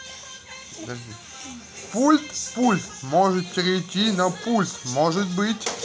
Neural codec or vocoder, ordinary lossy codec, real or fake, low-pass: none; none; real; none